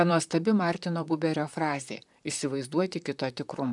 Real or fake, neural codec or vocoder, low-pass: fake; vocoder, 44.1 kHz, 128 mel bands, Pupu-Vocoder; 10.8 kHz